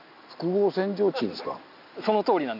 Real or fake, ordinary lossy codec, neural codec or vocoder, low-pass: real; AAC, 48 kbps; none; 5.4 kHz